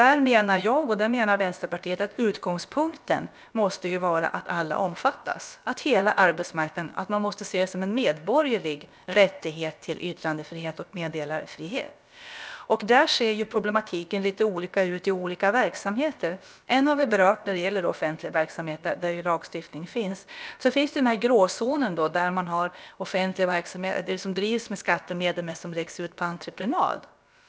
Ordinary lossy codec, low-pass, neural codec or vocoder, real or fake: none; none; codec, 16 kHz, about 1 kbps, DyCAST, with the encoder's durations; fake